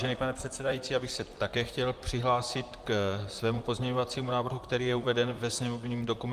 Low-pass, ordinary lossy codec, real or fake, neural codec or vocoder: 14.4 kHz; Opus, 32 kbps; fake; vocoder, 44.1 kHz, 128 mel bands, Pupu-Vocoder